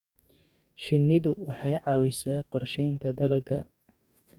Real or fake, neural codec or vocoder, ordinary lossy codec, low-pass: fake; codec, 44.1 kHz, 2.6 kbps, DAC; none; 19.8 kHz